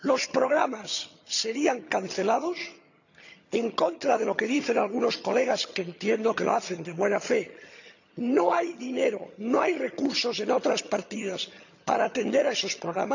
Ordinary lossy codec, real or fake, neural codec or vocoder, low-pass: none; fake; vocoder, 22.05 kHz, 80 mel bands, HiFi-GAN; 7.2 kHz